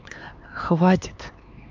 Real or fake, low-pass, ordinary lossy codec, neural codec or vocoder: fake; 7.2 kHz; MP3, 64 kbps; codec, 16 kHz, 4 kbps, X-Codec, HuBERT features, trained on LibriSpeech